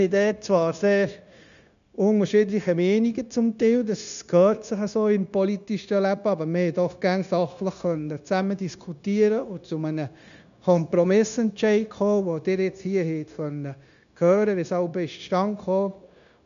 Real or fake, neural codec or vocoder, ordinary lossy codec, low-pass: fake; codec, 16 kHz, 0.9 kbps, LongCat-Audio-Codec; none; 7.2 kHz